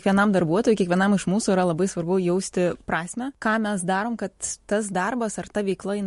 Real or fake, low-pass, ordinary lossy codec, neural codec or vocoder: real; 14.4 kHz; MP3, 48 kbps; none